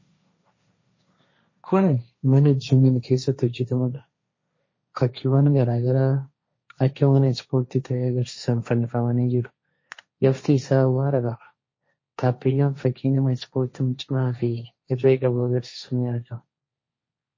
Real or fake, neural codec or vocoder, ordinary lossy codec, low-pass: fake; codec, 16 kHz, 1.1 kbps, Voila-Tokenizer; MP3, 32 kbps; 7.2 kHz